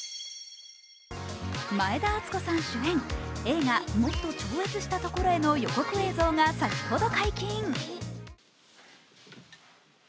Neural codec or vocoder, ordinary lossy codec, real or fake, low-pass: none; none; real; none